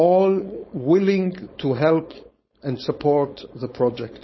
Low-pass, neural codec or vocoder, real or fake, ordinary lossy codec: 7.2 kHz; codec, 16 kHz, 4.8 kbps, FACodec; fake; MP3, 24 kbps